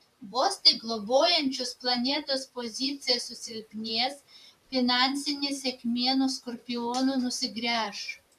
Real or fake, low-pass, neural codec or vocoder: fake; 14.4 kHz; vocoder, 44.1 kHz, 128 mel bands, Pupu-Vocoder